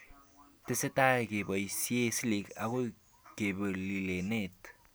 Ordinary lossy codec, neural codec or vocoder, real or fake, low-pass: none; none; real; none